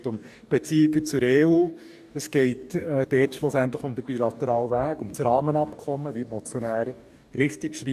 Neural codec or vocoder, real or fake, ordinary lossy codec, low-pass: codec, 44.1 kHz, 2.6 kbps, DAC; fake; none; 14.4 kHz